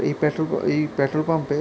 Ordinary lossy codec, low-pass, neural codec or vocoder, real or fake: none; none; none; real